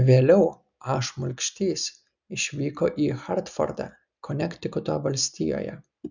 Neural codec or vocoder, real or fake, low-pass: none; real; 7.2 kHz